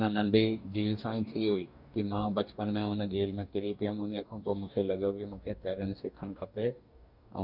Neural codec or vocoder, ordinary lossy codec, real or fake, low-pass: codec, 44.1 kHz, 2.6 kbps, DAC; none; fake; 5.4 kHz